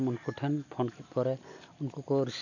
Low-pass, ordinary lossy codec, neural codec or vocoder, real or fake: 7.2 kHz; none; none; real